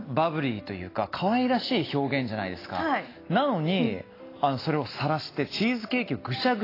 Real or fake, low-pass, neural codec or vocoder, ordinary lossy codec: real; 5.4 kHz; none; AAC, 24 kbps